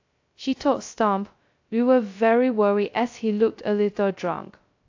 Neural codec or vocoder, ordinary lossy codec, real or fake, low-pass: codec, 16 kHz, 0.2 kbps, FocalCodec; AAC, 48 kbps; fake; 7.2 kHz